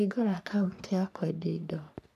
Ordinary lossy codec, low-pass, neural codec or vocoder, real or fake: none; 14.4 kHz; codec, 44.1 kHz, 3.4 kbps, Pupu-Codec; fake